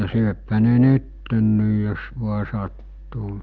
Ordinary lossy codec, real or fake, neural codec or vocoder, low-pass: Opus, 24 kbps; real; none; 7.2 kHz